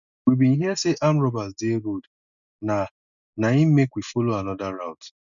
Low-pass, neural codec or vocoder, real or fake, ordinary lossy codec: 7.2 kHz; none; real; none